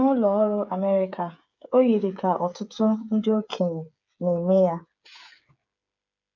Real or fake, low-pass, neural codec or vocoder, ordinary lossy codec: fake; 7.2 kHz; codec, 16 kHz, 8 kbps, FreqCodec, smaller model; none